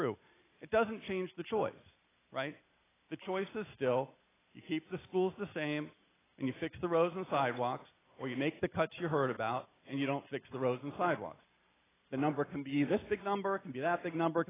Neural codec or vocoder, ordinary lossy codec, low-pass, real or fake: none; AAC, 16 kbps; 3.6 kHz; real